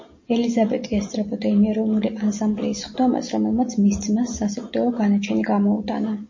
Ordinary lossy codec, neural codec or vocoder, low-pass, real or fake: MP3, 32 kbps; none; 7.2 kHz; real